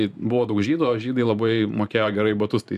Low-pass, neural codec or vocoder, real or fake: 14.4 kHz; vocoder, 44.1 kHz, 128 mel bands every 512 samples, BigVGAN v2; fake